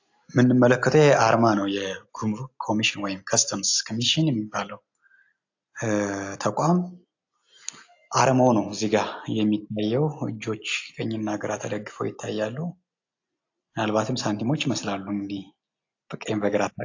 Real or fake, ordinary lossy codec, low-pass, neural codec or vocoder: real; AAC, 48 kbps; 7.2 kHz; none